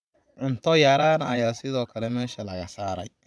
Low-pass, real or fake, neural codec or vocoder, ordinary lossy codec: none; fake; vocoder, 22.05 kHz, 80 mel bands, Vocos; none